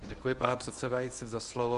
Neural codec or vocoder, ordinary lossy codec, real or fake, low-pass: codec, 24 kHz, 0.9 kbps, WavTokenizer, medium speech release version 1; MP3, 96 kbps; fake; 10.8 kHz